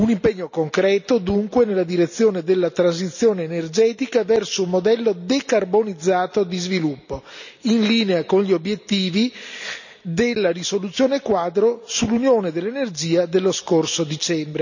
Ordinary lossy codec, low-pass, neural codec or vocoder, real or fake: none; 7.2 kHz; none; real